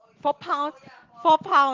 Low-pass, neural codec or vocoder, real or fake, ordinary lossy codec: 7.2 kHz; none; real; Opus, 16 kbps